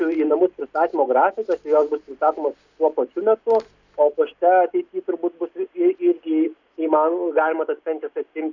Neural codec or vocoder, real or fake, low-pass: none; real; 7.2 kHz